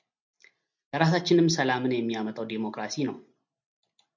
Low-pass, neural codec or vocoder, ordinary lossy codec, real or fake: 7.2 kHz; none; MP3, 64 kbps; real